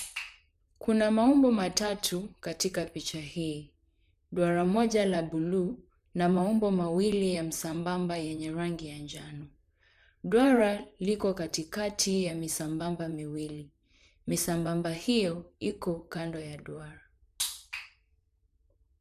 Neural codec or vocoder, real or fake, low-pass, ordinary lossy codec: vocoder, 44.1 kHz, 128 mel bands, Pupu-Vocoder; fake; 14.4 kHz; none